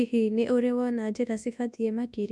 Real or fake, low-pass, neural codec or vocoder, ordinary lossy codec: fake; none; codec, 24 kHz, 0.9 kbps, WavTokenizer, large speech release; none